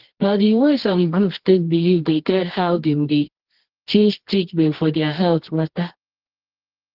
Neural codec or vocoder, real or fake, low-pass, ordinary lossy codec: codec, 24 kHz, 0.9 kbps, WavTokenizer, medium music audio release; fake; 5.4 kHz; Opus, 16 kbps